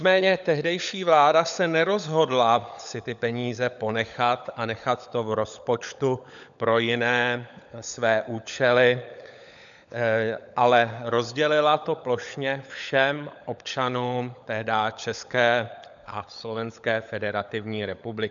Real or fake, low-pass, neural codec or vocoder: fake; 7.2 kHz; codec, 16 kHz, 16 kbps, FunCodec, trained on LibriTTS, 50 frames a second